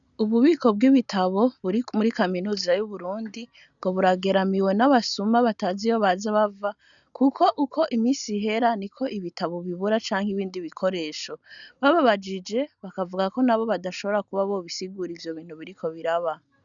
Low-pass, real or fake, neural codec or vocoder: 7.2 kHz; real; none